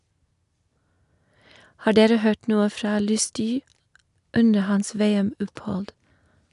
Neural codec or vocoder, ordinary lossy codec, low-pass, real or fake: none; none; 10.8 kHz; real